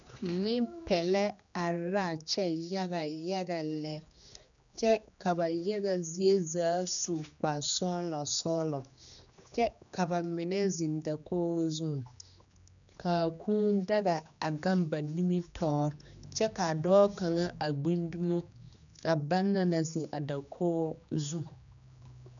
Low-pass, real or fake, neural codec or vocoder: 7.2 kHz; fake; codec, 16 kHz, 2 kbps, X-Codec, HuBERT features, trained on general audio